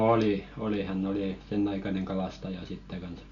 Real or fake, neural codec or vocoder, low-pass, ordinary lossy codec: real; none; 7.2 kHz; none